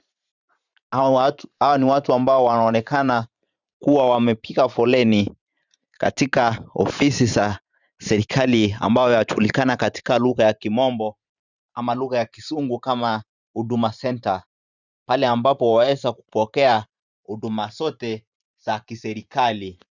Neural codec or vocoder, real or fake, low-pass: vocoder, 44.1 kHz, 128 mel bands every 512 samples, BigVGAN v2; fake; 7.2 kHz